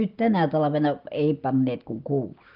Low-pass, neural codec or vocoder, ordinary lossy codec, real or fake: 5.4 kHz; none; Opus, 24 kbps; real